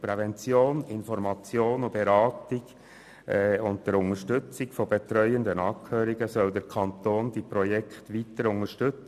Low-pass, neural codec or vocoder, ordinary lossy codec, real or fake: 14.4 kHz; none; none; real